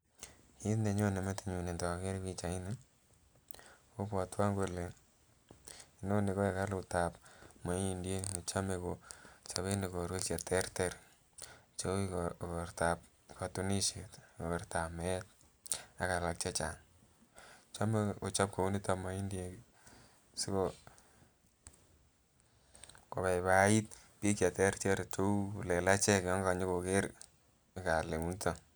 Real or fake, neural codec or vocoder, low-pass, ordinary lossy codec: real; none; none; none